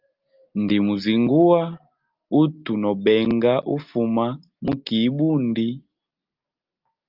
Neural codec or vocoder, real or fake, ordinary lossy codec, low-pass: none; real; Opus, 24 kbps; 5.4 kHz